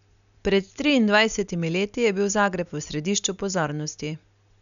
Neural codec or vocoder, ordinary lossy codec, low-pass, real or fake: none; none; 7.2 kHz; real